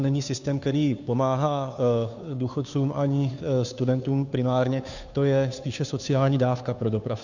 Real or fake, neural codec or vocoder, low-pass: fake; codec, 16 kHz, 2 kbps, FunCodec, trained on Chinese and English, 25 frames a second; 7.2 kHz